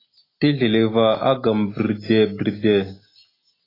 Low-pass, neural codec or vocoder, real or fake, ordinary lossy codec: 5.4 kHz; none; real; AAC, 24 kbps